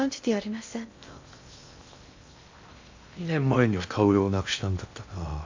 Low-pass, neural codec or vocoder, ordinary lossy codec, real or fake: 7.2 kHz; codec, 16 kHz in and 24 kHz out, 0.6 kbps, FocalCodec, streaming, 2048 codes; AAC, 48 kbps; fake